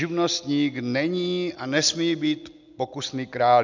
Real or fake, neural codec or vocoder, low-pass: real; none; 7.2 kHz